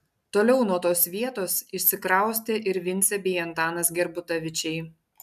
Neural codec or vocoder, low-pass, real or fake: none; 14.4 kHz; real